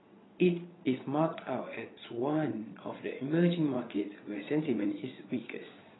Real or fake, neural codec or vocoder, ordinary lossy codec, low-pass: fake; vocoder, 22.05 kHz, 80 mel bands, Vocos; AAC, 16 kbps; 7.2 kHz